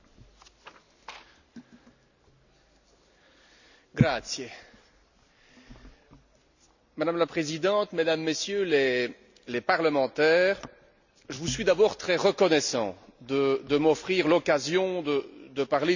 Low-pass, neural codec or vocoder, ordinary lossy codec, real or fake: 7.2 kHz; none; none; real